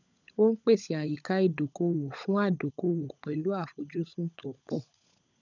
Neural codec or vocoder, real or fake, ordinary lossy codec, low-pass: codec, 16 kHz, 16 kbps, FunCodec, trained on LibriTTS, 50 frames a second; fake; none; 7.2 kHz